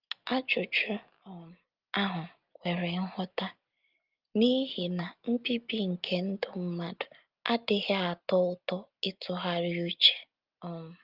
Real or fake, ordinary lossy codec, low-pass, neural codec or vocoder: real; Opus, 32 kbps; 5.4 kHz; none